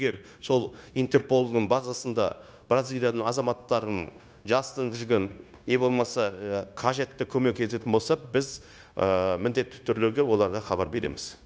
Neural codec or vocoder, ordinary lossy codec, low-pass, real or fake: codec, 16 kHz, 0.9 kbps, LongCat-Audio-Codec; none; none; fake